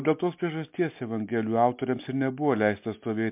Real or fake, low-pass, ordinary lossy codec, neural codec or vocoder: real; 3.6 kHz; MP3, 32 kbps; none